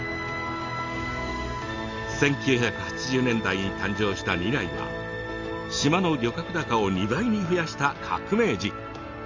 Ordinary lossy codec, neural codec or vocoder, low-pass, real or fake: Opus, 32 kbps; none; 7.2 kHz; real